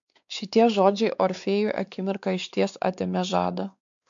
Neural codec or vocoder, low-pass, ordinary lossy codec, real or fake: codec, 16 kHz, 4 kbps, X-Codec, WavLM features, trained on Multilingual LibriSpeech; 7.2 kHz; AAC, 64 kbps; fake